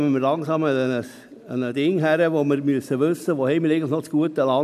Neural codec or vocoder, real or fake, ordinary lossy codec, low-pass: none; real; MP3, 96 kbps; 14.4 kHz